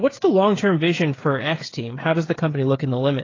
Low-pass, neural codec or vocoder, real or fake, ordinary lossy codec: 7.2 kHz; codec, 16 kHz, 8 kbps, FreqCodec, smaller model; fake; AAC, 32 kbps